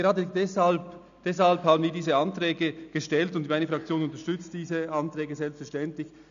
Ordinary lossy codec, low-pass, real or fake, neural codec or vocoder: none; 7.2 kHz; real; none